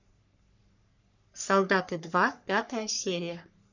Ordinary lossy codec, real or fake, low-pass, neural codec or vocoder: none; fake; 7.2 kHz; codec, 44.1 kHz, 3.4 kbps, Pupu-Codec